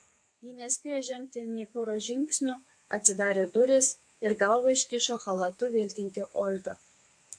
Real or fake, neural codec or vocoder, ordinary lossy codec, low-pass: fake; codec, 32 kHz, 1.9 kbps, SNAC; AAC, 64 kbps; 9.9 kHz